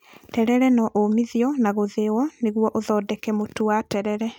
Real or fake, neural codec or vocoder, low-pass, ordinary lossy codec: real; none; 19.8 kHz; none